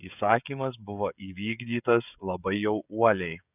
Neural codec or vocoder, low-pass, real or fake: codec, 16 kHz, 16 kbps, FreqCodec, smaller model; 3.6 kHz; fake